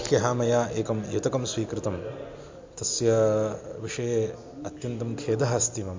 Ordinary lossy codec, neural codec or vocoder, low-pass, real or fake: MP3, 48 kbps; none; 7.2 kHz; real